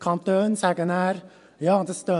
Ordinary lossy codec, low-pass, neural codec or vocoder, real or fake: MP3, 96 kbps; 10.8 kHz; vocoder, 24 kHz, 100 mel bands, Vocos; fake